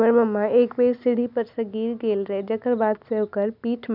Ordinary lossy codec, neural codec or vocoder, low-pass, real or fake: none; none; 5.4 kHz; real